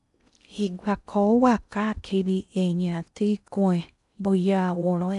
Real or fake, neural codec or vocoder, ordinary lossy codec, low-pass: fake; codec, 16 kHz in and 24 kHz out, 0.6 kbps, FocalCodec, streaming, 2048 codes; none; 10.8 kHz